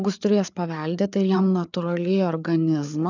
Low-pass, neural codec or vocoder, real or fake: 7.2 kHz; codec, 16 kHz, 4 kbps, FreqCodec, larger model; fake